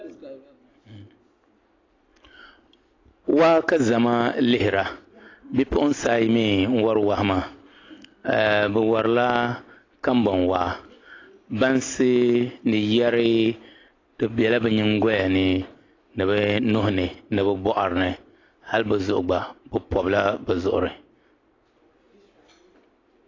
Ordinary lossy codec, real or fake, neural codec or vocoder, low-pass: AAC, 32 kbps; real; none; 7.2 kHz